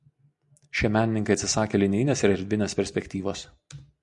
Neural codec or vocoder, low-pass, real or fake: none; 10.8 kHz; real